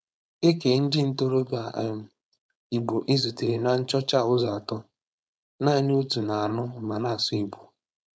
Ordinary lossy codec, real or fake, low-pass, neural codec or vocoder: none; fake; none; codec, 16 kHz, 4.8 kbps, FACodec